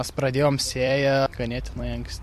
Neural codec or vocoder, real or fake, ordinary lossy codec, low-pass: vocoder, 44.1 kHz, 128 mel bands every 256 samples, BigVGAN v2; fake; MP3, 64 kbps; 14.4 kHz